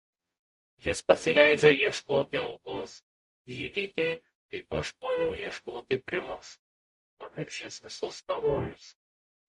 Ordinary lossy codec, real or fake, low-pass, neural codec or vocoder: MP3, 48 kbps; fake; 14.4 kHz; codec, 44.1 kHz, 0.9 kbps, DAC